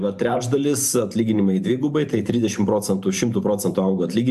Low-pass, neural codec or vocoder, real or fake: 14.4 kHz; vocoder, 44.1 kHz, 128 mel bands every 512 samples, BigVGAN v2; fake